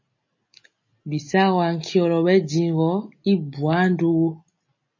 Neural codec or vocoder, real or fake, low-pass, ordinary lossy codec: none; real; 7.2 kHz; MP3, 32 kbps